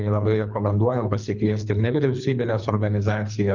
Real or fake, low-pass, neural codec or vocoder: fake; 7.2 kHz; codec, 24 kHz, 3 kbps, HILCodec